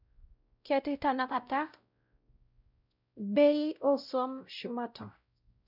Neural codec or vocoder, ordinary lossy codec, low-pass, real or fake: codec, 16 kHz, 0.5 kbps, X-Codec, WavLM features, trained on Multilingual LibriSpeech; none; 5.4 kHz; fake